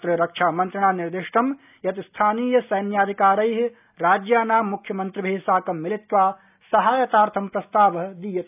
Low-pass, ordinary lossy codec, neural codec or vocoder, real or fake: 3.6 kHz; none; none; real